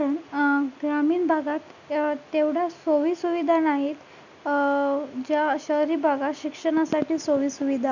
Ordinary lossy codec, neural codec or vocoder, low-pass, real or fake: none; none; 7.2 kHz; real